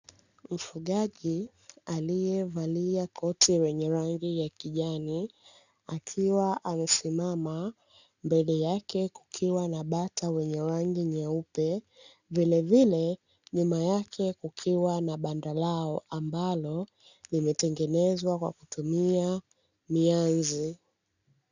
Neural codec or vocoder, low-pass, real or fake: none; 7.2 kHz; real